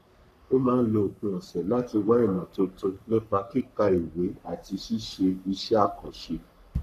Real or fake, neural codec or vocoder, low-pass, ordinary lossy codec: fake; codec, 44.1 kHz, 3.4 kbps, Pupu-Codec; 14.4 kHz; none